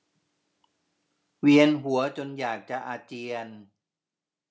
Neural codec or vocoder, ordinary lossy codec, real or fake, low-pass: none; none; real; none